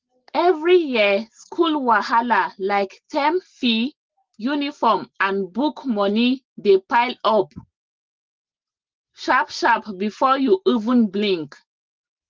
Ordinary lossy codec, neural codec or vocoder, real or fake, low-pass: Opus, 16 kbps; none; real; 7.2 kHz